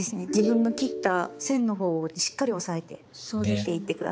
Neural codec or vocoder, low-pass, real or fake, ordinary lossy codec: codec, 16 kHz, 4 kbps, X-Codec, HuBERT features, trained on balanced general audio; none; fake; none